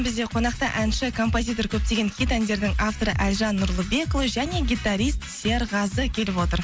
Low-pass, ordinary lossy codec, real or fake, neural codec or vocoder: none; none; real; none